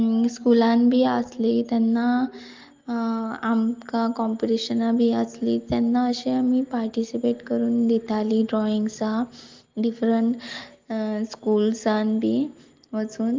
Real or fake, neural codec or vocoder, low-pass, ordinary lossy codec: real; none; 7.2 kHz; Opus, 32 kbps